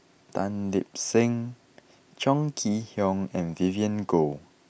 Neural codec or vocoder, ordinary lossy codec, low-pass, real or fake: none; none; none; real